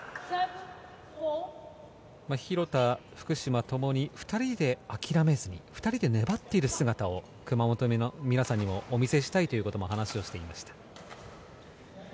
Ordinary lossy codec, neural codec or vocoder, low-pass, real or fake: none; none; none; real